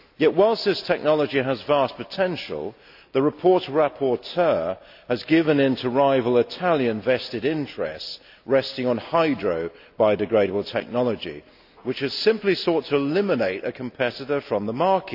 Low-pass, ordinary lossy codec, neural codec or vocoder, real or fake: 5.4 kHz; AAC, 48 kbps; none; real